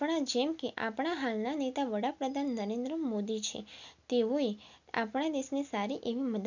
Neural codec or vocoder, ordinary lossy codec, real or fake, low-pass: none; none; real; 7.2 kHz